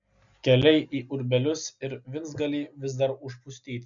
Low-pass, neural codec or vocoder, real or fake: 7.2 kHz; none; real